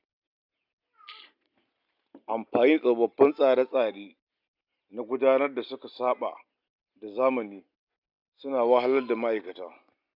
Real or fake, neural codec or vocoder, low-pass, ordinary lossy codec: real; none; 5.4 kHz; none